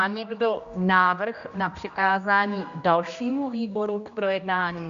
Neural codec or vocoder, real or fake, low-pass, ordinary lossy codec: codec, 16 kHz, 1 kbps, X-Codec, HuBERT features, trained on general audio; fake; 7.2 kHz; MP3, 64 kbps